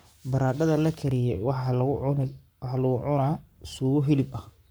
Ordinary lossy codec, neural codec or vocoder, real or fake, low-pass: none; codec, 44.1 kHz, 7.8 kbps, Pupu-Codec; fake; none